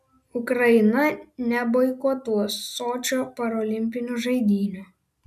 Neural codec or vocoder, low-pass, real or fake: none; 14.4 kHz; real